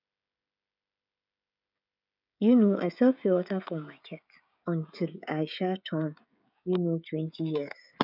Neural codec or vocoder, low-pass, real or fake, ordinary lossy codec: codec, 16 kHz, 16 kbps, FreqCodec, smaller model; 5.4 kHz; fake; none